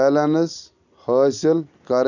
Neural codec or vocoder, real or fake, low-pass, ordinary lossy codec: none; real; 7.2 kHz; none